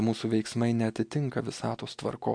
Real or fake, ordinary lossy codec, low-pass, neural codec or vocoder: real; MP3, 64 kbps; 9.9 kHz; none